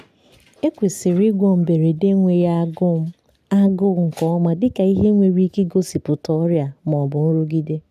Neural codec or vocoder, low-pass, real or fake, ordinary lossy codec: none; 14.4 kHz; real; none